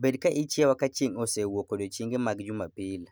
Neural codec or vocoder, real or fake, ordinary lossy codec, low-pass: none; real; none; none